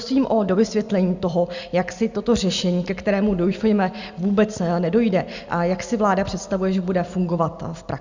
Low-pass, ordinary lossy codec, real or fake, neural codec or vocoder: 7.2 kHz; Opus, 64 kbps; real; none